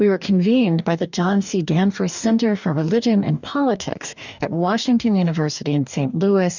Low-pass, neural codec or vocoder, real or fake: 7.2 kHz; codec, 44.1 kHz, 2.6 kbps, DAC; fake